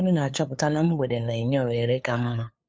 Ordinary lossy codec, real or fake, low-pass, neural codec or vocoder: none; fake; none; codec, 16 kHz, 2 kbps, FunCodec, trained on LibriTTS, 25 frames a second